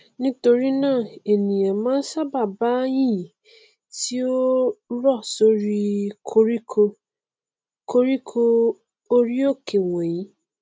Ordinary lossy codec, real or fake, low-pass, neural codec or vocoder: none; real; none; none